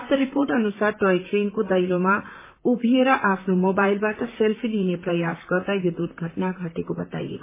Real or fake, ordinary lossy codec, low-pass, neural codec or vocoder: fake; MP3, 16 kbps; 3.6 kHz; vocoder, 44.1 kHz, 128 mel bands, Pupu-Vocoder